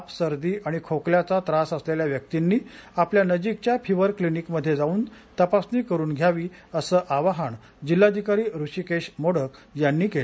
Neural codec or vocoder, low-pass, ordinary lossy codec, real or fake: none; none; none; real